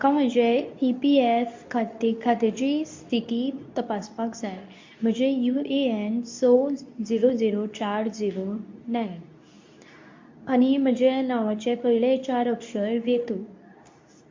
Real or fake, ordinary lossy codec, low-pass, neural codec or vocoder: fake; MP3, 48 kbps; 7.2 kHz; codec, 24 kHz, 0.9 kbps, WavTokenizer, medium speech release version 2